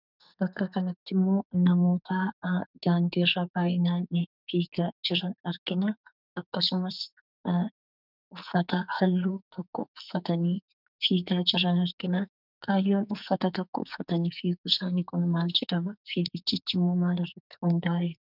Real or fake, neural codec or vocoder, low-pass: fake; codec, 44.1 kHz, 2.6 kbps, SNAC; 5.4 kHz